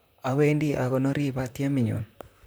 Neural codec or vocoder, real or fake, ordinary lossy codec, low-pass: vocoder, 44.1 kHz, 128 mel bands, Pupu-Vocoder; fake; none; none